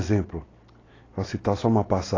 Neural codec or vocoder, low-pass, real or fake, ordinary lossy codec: none; 7.2 kHz; real; AAC, 32 kbps